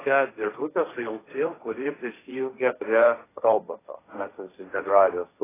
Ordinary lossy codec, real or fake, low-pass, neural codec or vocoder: AAC, 16 kbps; fake; 3.6 kHz; codec, 16 kHz, 1.1 kbps, Voila-Tokenizer